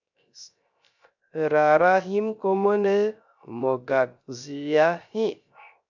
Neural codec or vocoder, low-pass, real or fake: codec, 16 kHz, 0.3 kbps, FocalCodec; 7.2 kHz; fake